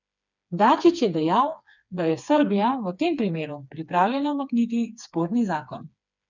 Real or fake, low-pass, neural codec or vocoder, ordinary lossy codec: fake; 7.2 kHz; codec, 16 kHz, 4 kbps, FreqCodec, smaller model; none